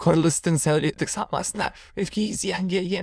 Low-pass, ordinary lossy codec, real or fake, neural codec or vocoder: none; none; fake; autoencoder, 22.05 kHz, a latent of 192 numbers a frame, VITS, trained on many speakers